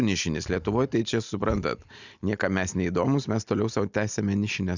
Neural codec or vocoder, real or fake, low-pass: none; real; 7.2 kHz